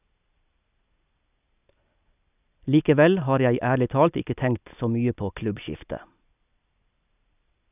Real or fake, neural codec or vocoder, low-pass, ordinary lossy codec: real; none; 3.6 kHz; none